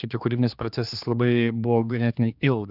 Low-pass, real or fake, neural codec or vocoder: 5.4 kHz; fake; codec, 16 kHz, 2 kbps, X-Codec, HuBERT features, trained on general audio